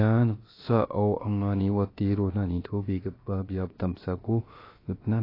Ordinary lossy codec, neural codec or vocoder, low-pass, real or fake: AAC, 24 kbps; codec, 16 kHz, about 1 kbps, DyCAST, with the encoder's durations; 5.4 kHz; fake